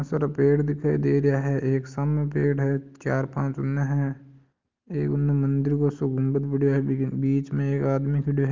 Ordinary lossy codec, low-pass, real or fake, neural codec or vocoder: Opus, 24 kbps; 7.2 kHz; real; none